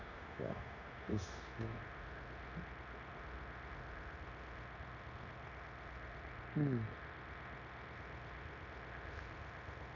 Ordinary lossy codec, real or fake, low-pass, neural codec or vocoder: none; fake; 7.2 kHz; codec, 16 kHz, 0.9 kbps, LongCat-Audio-Codec